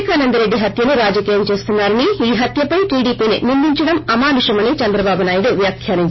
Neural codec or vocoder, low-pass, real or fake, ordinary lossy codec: none; 7.2 kHz; real; MP3, 24 kbps